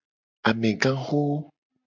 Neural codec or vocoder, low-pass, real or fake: none; 7.2 kHz; real